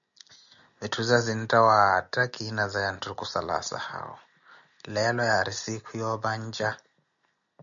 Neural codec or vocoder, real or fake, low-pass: none; real; 7.2 kHz